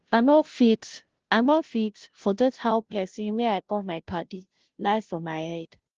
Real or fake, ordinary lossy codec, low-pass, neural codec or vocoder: fake; Opus, 16 kbps; 7.2 kHz; codec, 16 kHz, 0.5 kbps, FunCodec, trained on Chinese and English, 25 frames a second